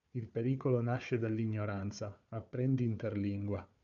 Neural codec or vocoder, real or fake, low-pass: codec, 16 kHz, 4 kbps, FunCodec, trained on Chinese and English, 50 frames a second; fake; 7.2 kHz